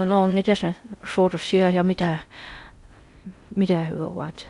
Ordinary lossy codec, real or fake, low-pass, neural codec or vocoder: Opus, 64 kbps; fake; 10.8 kHz; codec, 16 kHz in and 24 kHz out, 0.6 kbps, FocalCodec, streaming, 4096 codes